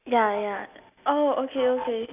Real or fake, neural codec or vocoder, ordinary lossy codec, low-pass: real; none; none; 3.6 kHz